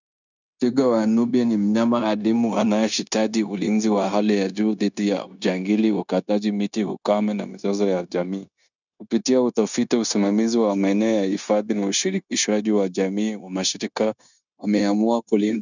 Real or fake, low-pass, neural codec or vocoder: fake; 7.2 kHz; codec, 16 kHz, 0.9 kbps, LongCat-Audio-Codec